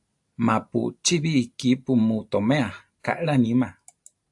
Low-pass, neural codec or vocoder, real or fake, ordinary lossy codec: 10.8 kHz; none; real; AAC, 64 kbps